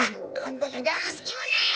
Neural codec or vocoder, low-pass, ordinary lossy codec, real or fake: codec, 16 kHz, 0.8 kbps, ZipCodec; none; none; fake